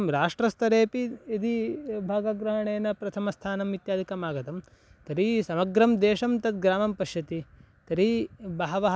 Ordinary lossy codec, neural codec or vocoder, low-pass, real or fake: none; none; none; real